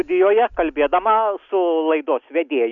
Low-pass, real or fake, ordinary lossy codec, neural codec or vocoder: 7.2 kHz; real; Opus, 64 kbps; none